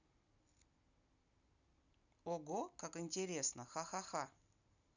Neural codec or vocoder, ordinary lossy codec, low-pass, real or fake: none; none; 7.2 kHz; real